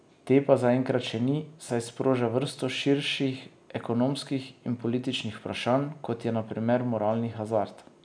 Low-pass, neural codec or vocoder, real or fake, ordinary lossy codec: 9.9 kHz; none; real; none